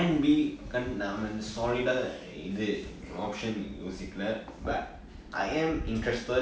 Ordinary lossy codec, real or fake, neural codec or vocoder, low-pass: none; real; none; none